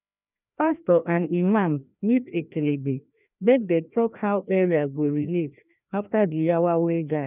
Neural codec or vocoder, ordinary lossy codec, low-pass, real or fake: codec, 16 kHz, 1 kbps, FreqCodec, larger model; none; 3.6 kHz; fake